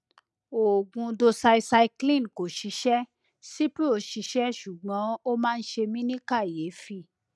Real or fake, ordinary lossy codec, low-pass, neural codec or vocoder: real; none; none; none